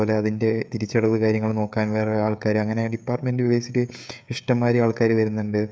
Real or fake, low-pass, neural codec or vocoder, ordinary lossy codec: fake; none; codec, 16 kHz, 16 kbps, FreqCodec, smaller model; none